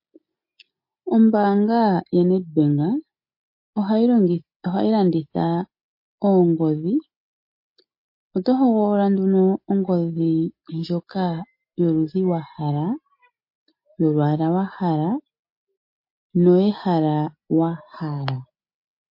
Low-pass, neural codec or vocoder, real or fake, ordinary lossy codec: 5.4 kHz; none; real; MP3, 32 kbps